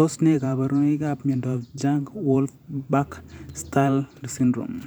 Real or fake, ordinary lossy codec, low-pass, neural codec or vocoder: fake; none; none; vocoder, 44.1 kHz, 128 mel bands every 512 samples, BigVGAN v2